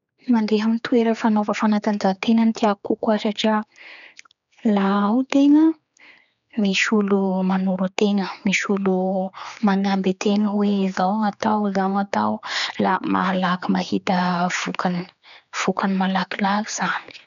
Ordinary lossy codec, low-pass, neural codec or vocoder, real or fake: none; 7.2 kHz; codec, 16 kHz, 4 kbps, X-Codec, HuBERT features, trained on general audio; fake